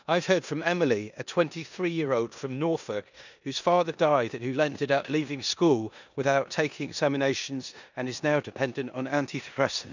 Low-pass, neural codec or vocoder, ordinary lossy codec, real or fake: 7.2 kHz; codec, 16 kHz in and 24 kHz out, 0.9 kbps, LongCat-Audio-Codec, four codebook decoder; none; fake